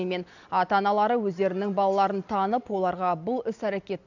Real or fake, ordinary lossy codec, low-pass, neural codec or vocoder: real; none; 7.2 kHz; none